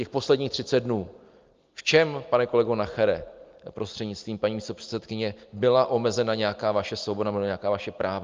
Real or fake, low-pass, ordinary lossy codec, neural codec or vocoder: real; 7.2 kHz; Opus, 24 kbps; none